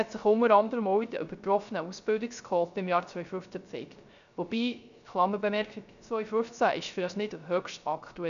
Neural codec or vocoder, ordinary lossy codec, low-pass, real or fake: codec, 16 kHz, 0.3 kbps, FocalCodec; none; 7.2 kHz; fake